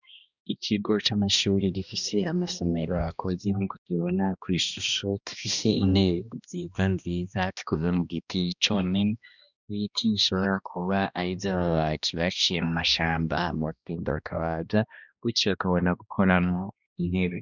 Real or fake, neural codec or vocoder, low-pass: fake; codec, 16 kHz, 1 kbps, X-Codec, HuBERT features, trained on balanced general audio; 7.2 kHz